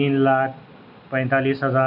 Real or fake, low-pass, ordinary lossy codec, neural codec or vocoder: real; 5.4 kHz; none; none